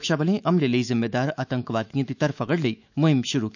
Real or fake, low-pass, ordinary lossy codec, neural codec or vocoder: fake; 7.2 kHz; none; autoencoder, 48 kHz, 128 numbers a frame, DAC-VAE, trained on Japanese speech